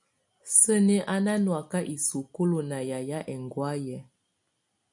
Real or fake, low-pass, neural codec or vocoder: real; 10.8 kHz; none